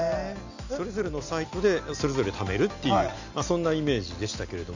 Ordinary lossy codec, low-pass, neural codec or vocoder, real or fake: none; 7.2 kHz; none; real